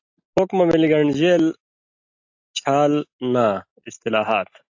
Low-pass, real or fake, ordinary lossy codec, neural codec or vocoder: 7.2 kHz; real; AAC, 48 kbps; none